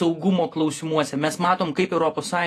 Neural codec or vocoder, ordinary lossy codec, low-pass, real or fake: vocoder, 48 kHz, 128 mel bands, Vocos; AAC, 48 kbps; 14.4 kHz; fake